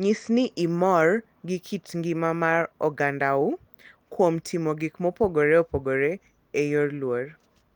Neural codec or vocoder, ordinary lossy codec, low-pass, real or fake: none; Opus, 24 kbps; 19.8 kHz; real